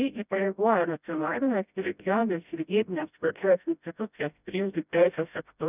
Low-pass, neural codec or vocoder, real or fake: 3.6 kHz; codec, 16 kHz, 0.5 kbps, FreqCodec, smaller model; fake